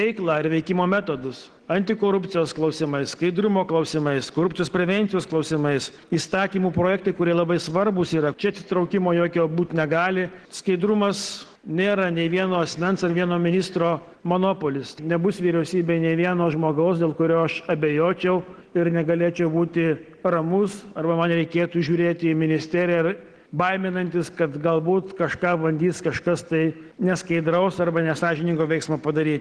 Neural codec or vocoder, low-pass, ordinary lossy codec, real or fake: none; 10.8 kHz; Opus, 16 kbps; real